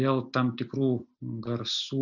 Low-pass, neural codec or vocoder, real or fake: 7.2 kHz; none; real